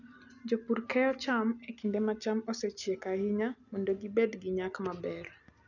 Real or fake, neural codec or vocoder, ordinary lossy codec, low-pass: real; none; none; 7.2 kHz